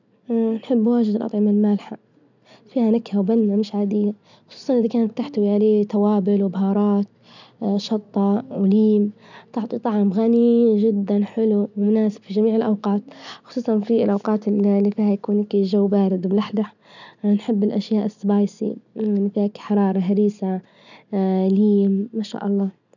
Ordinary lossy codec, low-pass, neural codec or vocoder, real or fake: none; 7.2 kHz; none; real